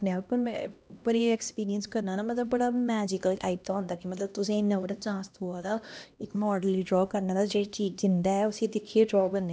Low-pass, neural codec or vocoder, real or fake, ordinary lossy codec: none; codec, 16 kHz, 1 kbps, X-Codec, HuBERT features, trained on LibriSpeech; fake; none